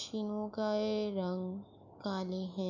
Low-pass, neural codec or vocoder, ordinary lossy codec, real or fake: 7.2 kHz; autoencoder, 48 kHz, 128 numbers a frame, DAC-VAE, trained on Japanese speech; none; fake